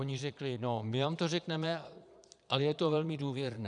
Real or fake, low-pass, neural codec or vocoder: fake; 9.9 kHz; vocoder, 22.05 kHz, 80 mel bands, Vocos